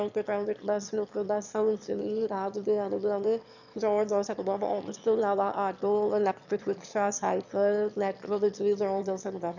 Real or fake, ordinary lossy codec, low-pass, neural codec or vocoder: fake; none; 7.2 kHz; autoencoder, 22.05 kHz, a latent of 192 numbers a frame, VITS, trained on one speaker